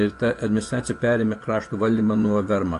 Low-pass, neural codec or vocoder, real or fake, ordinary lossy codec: 10.8 kHz; vocoder, 24 kHz, 100 mel bands, Vocos; fake; AAC, 48 kbps